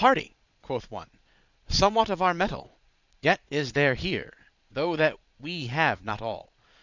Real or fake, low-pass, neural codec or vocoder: fake; 7.2 kHz; vocoder, 22.05 kHz, 80 mel bands, Vocos